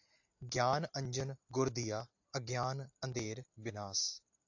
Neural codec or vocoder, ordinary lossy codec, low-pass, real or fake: none; AAC, 48 kbps; 7.2 kHz; real